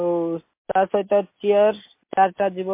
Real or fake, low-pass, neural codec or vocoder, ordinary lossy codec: real; 3.6 kHz; none; MP3, 24 kbps